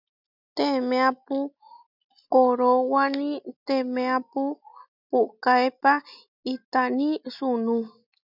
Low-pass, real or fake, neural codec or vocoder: 5.4 kHz; real; none